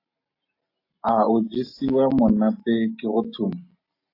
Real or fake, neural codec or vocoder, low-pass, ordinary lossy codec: real; none; 5.4 kHz; AAC, 32 kbps